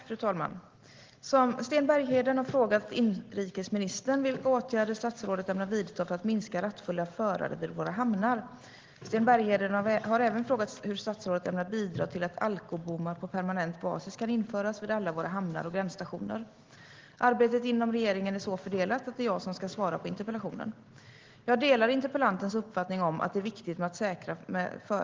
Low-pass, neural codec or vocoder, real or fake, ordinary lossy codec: 7.2 kHz; none; real; Opus, 16 kbps